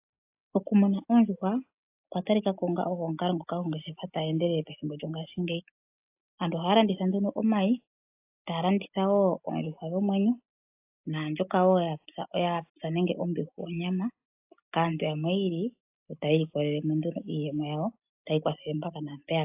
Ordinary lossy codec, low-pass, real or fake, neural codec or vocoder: AAC, 32 kbps; 3.6 kHz; real; none